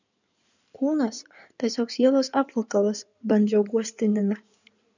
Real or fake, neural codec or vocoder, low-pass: fake; codec, 16 kHz in and 24 kHz out, 2.2 kbps, FireRedTTS-2 codec; 7.2 kHz